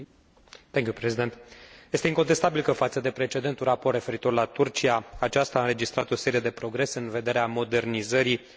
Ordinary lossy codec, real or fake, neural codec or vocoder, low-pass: none; real; none; none